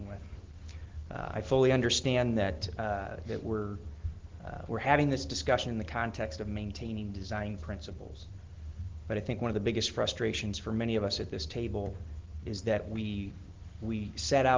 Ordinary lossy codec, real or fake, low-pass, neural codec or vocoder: Opus, 16 kbps; real; 7.2 kHz; none